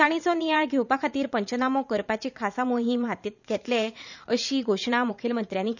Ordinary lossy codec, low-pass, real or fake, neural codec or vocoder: none; 7.2 kHz; fake; vocoder, 44.1 kHz, 80 mel bands, Vocos